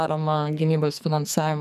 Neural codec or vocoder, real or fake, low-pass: codec, 44.1 kHz, 2.6 kbps, SNAC; fake; 14.4 kHz